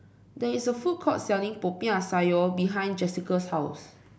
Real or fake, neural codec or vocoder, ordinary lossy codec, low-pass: real; none; none; none